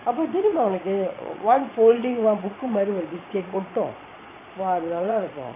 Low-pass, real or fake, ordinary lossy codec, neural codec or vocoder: 3.6 kHz; fake; none; vocoder, 22.05 kHz, 80 mel bands, Vocos